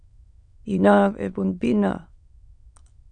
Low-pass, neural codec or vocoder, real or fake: 9.9 kHz; autoencoder, 22.05 kHz, a latent of 192 numbers a frame, VITS, trained on many speakers; fake